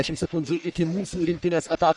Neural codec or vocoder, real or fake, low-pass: codec, 44.1 kHz, 1.7 kbps, Pupu-Codec; fake; 10.8 kHz